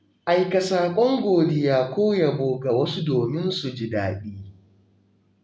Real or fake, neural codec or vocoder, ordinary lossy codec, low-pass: real; none; none; none